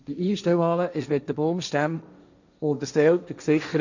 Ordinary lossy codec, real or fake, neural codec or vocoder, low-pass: none; fake; codec, 16 kHz, 1.1 kbps, Voila-Tokenizer; 7.2 kHz